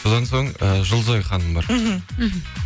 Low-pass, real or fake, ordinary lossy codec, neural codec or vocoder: none; real; none; none